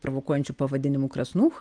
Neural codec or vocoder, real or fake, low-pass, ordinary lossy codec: none; real; 9.9 kHz; Opus, 32 kbps